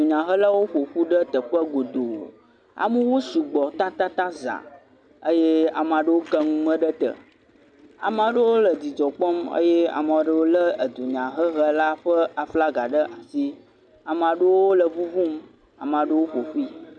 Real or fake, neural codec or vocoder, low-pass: real; none; 9.9 kHz